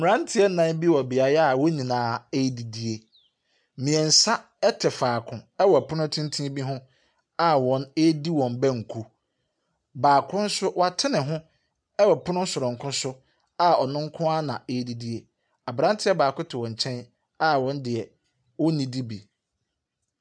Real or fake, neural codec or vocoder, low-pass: real; none; 9.9 kHz